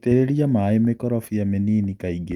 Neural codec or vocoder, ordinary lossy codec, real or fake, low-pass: none; Opus, 32 kbps; real; 19.8 kHz